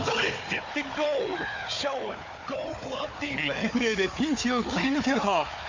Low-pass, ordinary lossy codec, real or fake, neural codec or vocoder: 7.2 kHz; MP3, 48 kbps; fake; codec, 16 kHz, 4 kbps, FunCodec, trained on Chinese and English, 50 frames a second